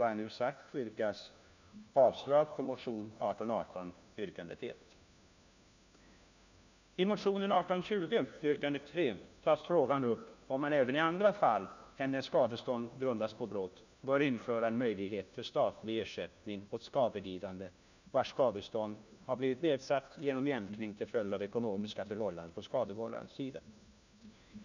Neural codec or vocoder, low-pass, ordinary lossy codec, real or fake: codec, 16 kHz, 1 kbps, FunCodec, trained on LibriTTS, 50 frames a second; 7.2 kHz; none; fake